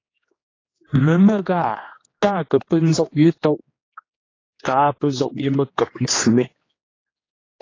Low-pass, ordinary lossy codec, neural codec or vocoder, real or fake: 7.2 kHz; AAC, 32 kbps; codec, 16 kHz, 2 kbps, X-Codec, HuBERT features, trained on general audio; fake